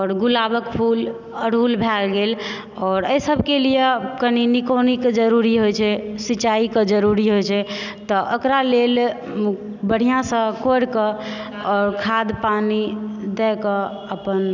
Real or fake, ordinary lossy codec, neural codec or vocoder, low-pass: real; none; none; 7.2 kHz